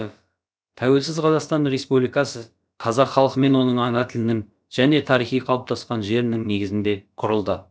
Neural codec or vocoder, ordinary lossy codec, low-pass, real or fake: codec, 16 kHz, about 1 kbps, DyCAST, with the encoder's durations; none; none; fake